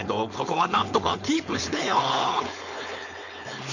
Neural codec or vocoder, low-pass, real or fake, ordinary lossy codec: codec, 16 kHz, 4.8 kbps, FACodec; 7.2 kHz; fake; none